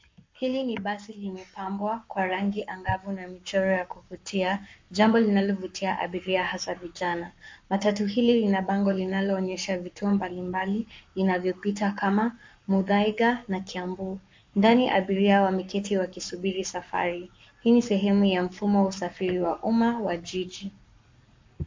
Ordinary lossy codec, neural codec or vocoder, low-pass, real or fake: MP3, 48 kbps; codec, 44.1 kHz, 7.8 kbps, Pupu-Codec; 7.2 kHz; fake